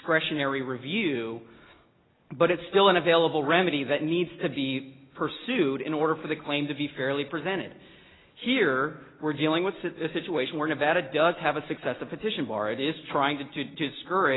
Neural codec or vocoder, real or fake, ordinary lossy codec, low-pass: none; real; AAC, 16 kbps; 7.2 kHz